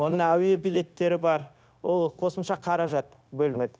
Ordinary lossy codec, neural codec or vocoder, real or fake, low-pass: none; codec, 16 kHz, 0.9 kbps, LongCat-Audio-Codec; fake; none